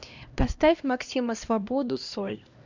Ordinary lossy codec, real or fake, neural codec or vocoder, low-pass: Opus, 64 kbps; fake; codec, 16 kHz, 1 kbps, X-Codec, HuBERT features, trained on LibriSpeech; 7.2 kHz